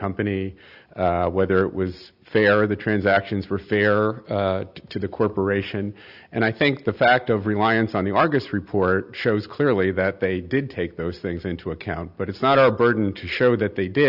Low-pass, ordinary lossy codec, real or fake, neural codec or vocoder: 5.4 kHz; Opus, 64 kbps; real; none